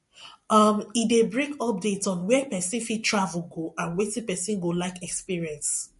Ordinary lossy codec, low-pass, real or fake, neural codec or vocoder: MP3, 48 kbps; 14.4 kHz; real; none